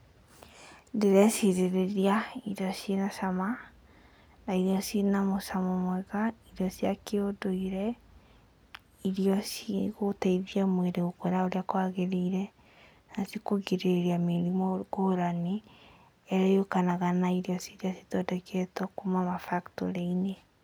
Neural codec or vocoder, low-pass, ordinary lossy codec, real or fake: none; none; none; real